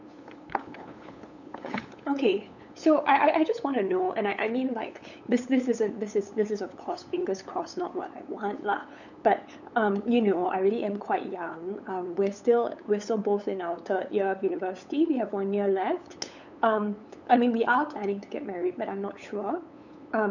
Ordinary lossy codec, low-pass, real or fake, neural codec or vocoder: none; 7.2 kHz; fake; codec, 16 kHz, 8 kbps, FunCodec, trained on LibriTTS, 25 frames a second